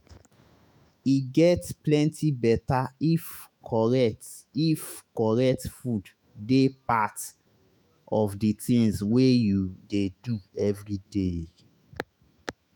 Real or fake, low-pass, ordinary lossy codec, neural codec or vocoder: fake; none; none; autoencoder, 48 kHz, 128 numbers a frame, DAC-VAE, trained on Japanese speech